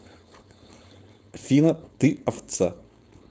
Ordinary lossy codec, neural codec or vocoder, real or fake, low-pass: none; codec, 16 kHz, 4.8 kbps, FACodec; fake; none